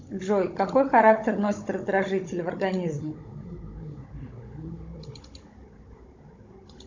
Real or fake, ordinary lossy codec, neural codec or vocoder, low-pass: fake; MP3, 48 kbps; codec, 16 kHz, 16 kbps, FunCodec, trained on Chinese and English, 50 frames a second; 7.2 kHz